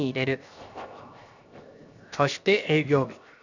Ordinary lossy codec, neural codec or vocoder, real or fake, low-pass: none; codec, 16 kHz, 0.7 kbps, FocalCodec; fake; 7.2 kHz